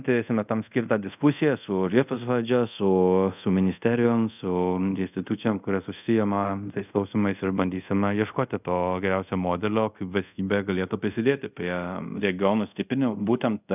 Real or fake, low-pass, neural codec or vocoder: fake; 3.6 kHz; codec, 24 kHz, 0.5 kbps, DualCodec